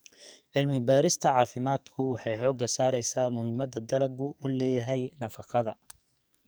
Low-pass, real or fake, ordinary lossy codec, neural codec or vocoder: none; fake; none; codec, 44.1 kHz, 2.6 kbps, SNAC